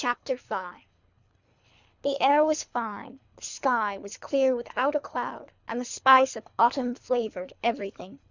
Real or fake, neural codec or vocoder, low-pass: fake; codec, 24 kHz, 3 kbps, HILCodec; 7.2 kHz